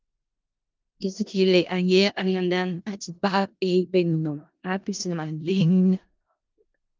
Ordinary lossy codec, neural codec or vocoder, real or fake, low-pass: Opus, 24 kbps; codec, 16 kHz in and 24 kHz out, 0.4 kbps, LongCat-Audio-Codec, four codebook decoder; fake; 7.2 kHz